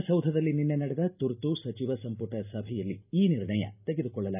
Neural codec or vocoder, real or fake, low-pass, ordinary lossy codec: none; real; 3.6 kHz; none